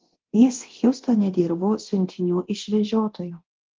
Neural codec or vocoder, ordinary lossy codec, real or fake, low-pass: codec, 24 kHz, 0.9 kbps, DualCodec; Opus, 16 kbps; fake; 7.2 kHz